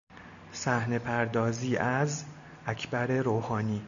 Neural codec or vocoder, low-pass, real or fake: none; 7.2 kHz; real